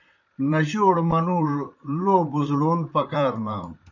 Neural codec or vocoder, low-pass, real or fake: vocoder, 44.1 kHz, 128 mel bands, Pupu-Vocoder; 7.2 kHz; fake